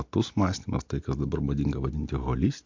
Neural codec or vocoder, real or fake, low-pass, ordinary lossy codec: none; real; 7.2 kHz; MP3, 48 kbps